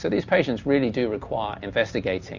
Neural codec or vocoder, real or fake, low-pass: none; real; 7.2 kHz